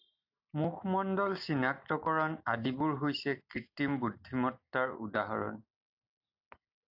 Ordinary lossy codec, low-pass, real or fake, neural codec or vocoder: AAC, 48 kbps; 5.4 kHz; real; none